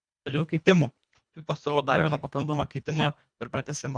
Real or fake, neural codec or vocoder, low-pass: fake; codec, 24 kHz, 1.5 kbps, HILCodec; 9.9 kHz